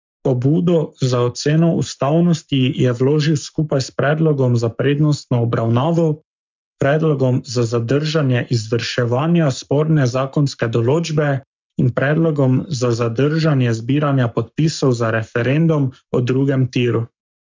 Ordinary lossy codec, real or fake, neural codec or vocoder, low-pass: MP3, 64 kbps; fake; codec, 44.1 kHz, 7.8 kbps, Pupu-Codec; 7.2 kHz